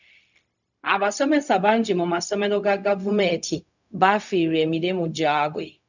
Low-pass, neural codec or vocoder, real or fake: 7.2 kHz; codec, 16 kHz, 0.4 kbps, LongCat-Audio-Codec; fake